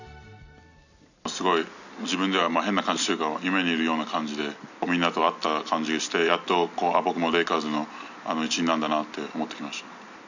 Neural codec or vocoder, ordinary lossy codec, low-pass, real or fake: none; none; 7.2 kHz; real